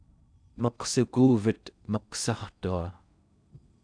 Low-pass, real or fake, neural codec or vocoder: 9.9 kHz; fake; codec, 16 kHz in and 24 kHz out, 0.6 kbps, FocalCodec, streaming, 4096 codes